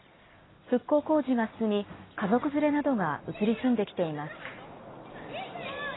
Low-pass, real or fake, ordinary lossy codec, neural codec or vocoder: 7.2 kHz; real; AAC, 16 kbps; none